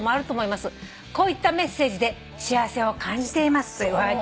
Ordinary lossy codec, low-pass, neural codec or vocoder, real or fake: none; none; none; real